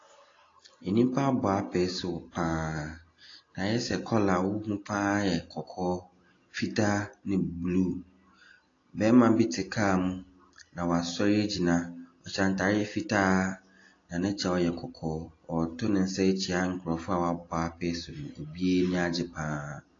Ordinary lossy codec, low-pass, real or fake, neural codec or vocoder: AAC, 32 kbps; 7.2 kHz; real; none